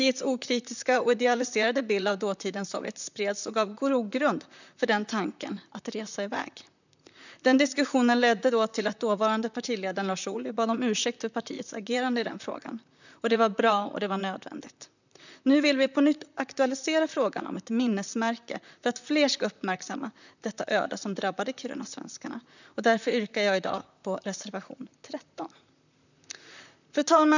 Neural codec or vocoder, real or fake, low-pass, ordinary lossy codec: vocoder, 44.1 kHz, 128 mel bands, Pupu-Vocoder; fake; 7.2 kHz; none